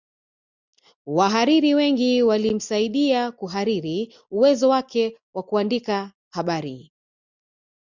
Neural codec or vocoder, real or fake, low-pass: none; real; 7.2 kHz